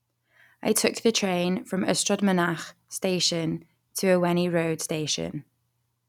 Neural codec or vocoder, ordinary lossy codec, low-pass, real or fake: none; none; 19.8 kHz; real